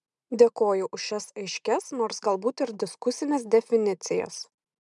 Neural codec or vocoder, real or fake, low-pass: none; real; 10.8 kHz